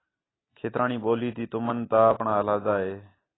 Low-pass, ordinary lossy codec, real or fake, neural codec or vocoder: 7.2 kHz; AAC, 16 kbps; real; none